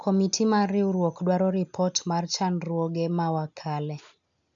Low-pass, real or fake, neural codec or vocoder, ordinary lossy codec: 7.2 kHz; real; none; MP3, 64 kbps